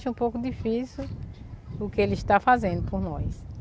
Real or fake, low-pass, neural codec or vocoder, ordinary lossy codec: real; none; none; none